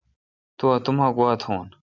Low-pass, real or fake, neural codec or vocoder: 7.2 kHz; real; none